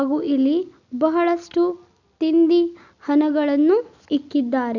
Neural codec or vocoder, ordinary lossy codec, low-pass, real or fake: none; none; 7.2 kHz; real